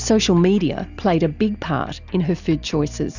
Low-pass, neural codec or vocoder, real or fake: 7.2 kHz; none; real